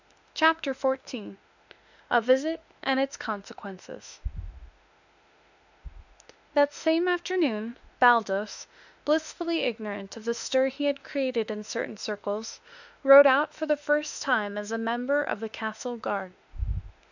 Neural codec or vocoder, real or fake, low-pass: autoencoder, 48 kHz, 32 numbers a frame, DAC-VAE, trained on Japanese speech; fake; 7.2 kHz